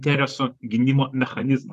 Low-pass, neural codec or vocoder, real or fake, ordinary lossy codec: 14.4 kHz; vocoder, 44.1 kHz, 128 mel bands, Pupu-Vocoder; fake; MP3, 96 kbps